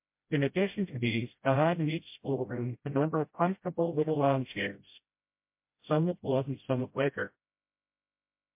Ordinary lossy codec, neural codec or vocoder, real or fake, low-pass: MP3, 32 kbps; codec, 16 kHz, 0.5 kbps, FreqCodec, smaller model; fake; 3.6 kHz